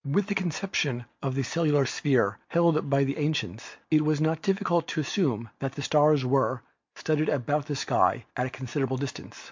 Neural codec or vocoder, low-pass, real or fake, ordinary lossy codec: none; 7.2 kHz; real; MP3, 48 kbps